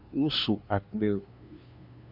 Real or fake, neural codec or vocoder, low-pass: fake; codec, 16 kHz, 2 kbps, FunCodec, trained on LibriTTS, 25 frames a second; 5.4 kHz